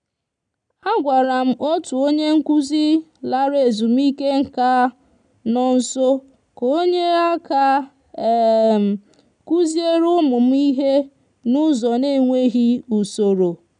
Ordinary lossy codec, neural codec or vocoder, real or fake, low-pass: none; none; real; 9.9 kHz